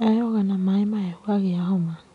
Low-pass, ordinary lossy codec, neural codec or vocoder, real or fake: 10.8 kHz; none; none; real